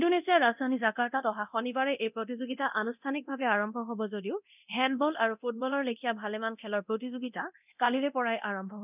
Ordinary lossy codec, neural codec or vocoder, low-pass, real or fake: none; codec, 24 kHz, 0.9 kbps, DualCodec; 3.6 kHz; fake